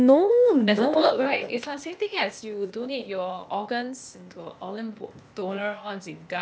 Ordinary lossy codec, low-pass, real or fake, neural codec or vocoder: none; none; fake; codec, 16 kHz, 0.8 kbps, ZipCodec